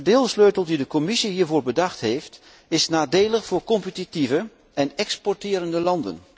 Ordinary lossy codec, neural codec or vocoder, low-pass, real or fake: none; none; none; real